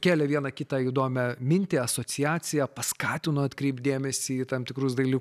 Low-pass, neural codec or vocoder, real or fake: 14.4 kHz; none; real